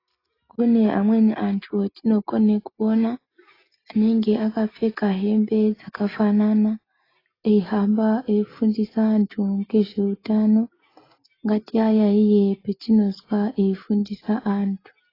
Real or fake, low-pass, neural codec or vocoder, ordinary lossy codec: real; 5.4 kHz; none; AAC, 24 kbps